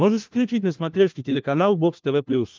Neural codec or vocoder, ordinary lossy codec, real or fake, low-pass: codec, 16 kHz, 1 kbps, FunCodec, trained on LibriTTS, 50 frames a second; Opus, 32 kbps; fake; 7.2 kHz